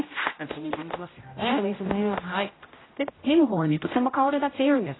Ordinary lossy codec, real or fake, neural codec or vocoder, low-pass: AAC, 16 kbps; fake; codec, 16 kHz, 0.5 kbps, X-Codec, HuBERT features, trained on general audio; 7.2 kHz